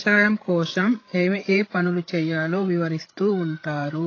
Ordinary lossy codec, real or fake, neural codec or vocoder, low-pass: AAC, 32 kbps; fake; codec, 16 kHz, 8 kbps, FreqCodec, larger model; 7.2 kHz